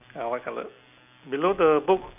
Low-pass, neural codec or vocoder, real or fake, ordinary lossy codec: 3.6 kHz; none; real; none